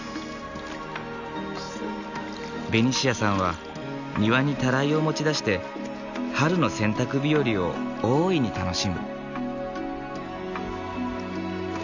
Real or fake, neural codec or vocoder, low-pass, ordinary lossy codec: real; none; 7.2 kHz; none